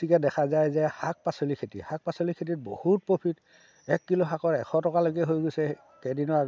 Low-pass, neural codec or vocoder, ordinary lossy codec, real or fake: none; none; none; real